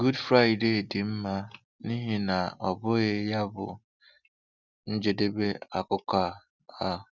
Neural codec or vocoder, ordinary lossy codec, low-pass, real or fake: none; none; 7.2 kHz; real